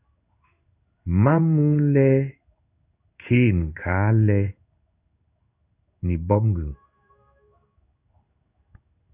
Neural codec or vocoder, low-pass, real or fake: codec, 16 kHz in and 24 kHz out, 1 kbps, XY-Tokenizer; 3.6 kHz; fake